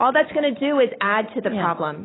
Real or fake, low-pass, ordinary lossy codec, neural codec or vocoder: real; 7.2 kHz; AAC, 16 kbps; none